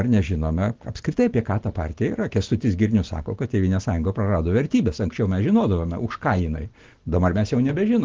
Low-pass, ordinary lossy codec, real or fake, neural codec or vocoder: 7.2 kHz; Opus, 16 kbps; real; none